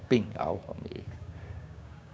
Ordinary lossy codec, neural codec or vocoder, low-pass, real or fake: none; codec, 16 kHz, 6 kbps, DAC; none; fake